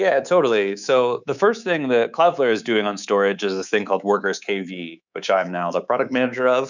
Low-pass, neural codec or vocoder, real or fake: 7.2 kHz; codec, 24 kHz, 3.1 kbps, DualCodec; fake